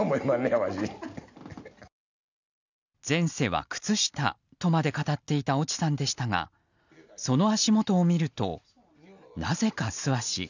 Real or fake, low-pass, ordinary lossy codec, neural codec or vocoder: real; 7.2 kHz; none; none